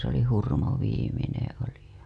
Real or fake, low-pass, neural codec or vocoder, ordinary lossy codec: real; none; none; none